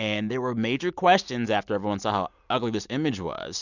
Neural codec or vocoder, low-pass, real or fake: none; 7.2 kHz; real